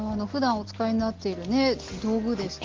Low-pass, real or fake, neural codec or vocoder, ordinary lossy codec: 7.2 kHz; real; none; Opus, 16 kbps